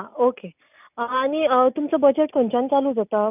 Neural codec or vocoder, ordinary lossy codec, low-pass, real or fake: none; none; 3.6 kHz; real